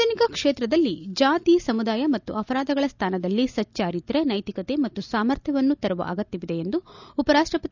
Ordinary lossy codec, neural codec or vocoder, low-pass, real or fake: none; none; 7.2 kHz; real